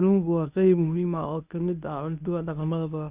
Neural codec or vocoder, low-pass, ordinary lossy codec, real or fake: codec, 24 kHz, 0.9 kbps, WavTokenizer, medium speech release version 1; 3.6 kHz; Opus, 64 kbps; fake